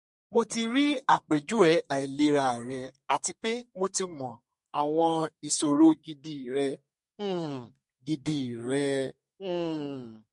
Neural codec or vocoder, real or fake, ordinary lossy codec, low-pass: codec, 32 kHz, 1.9 kbps, SNAC; fake; MP3, 48 kbps; 14.4 kHz